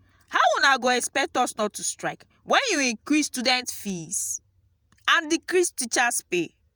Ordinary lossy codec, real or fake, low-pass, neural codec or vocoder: none; fake; none; vocoder, 48 kHz, 128 mel bands, Vocos